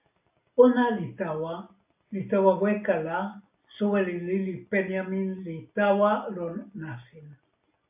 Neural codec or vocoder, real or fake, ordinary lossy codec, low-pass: none; real; AAC, 32 kbps; 3.6 kHz